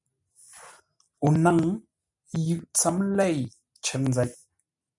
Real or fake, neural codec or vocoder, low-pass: real; none; 10.8 kHz